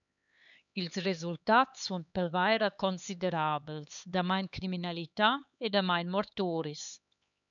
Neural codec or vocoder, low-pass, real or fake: codec, 16 kHz, 4 kbps, X-Codec, HuBERT features, trained on LibriSpeech; 7.2 kHz; fake